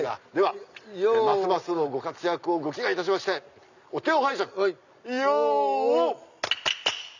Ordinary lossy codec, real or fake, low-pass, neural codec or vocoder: none; real; 7.2 kHz; none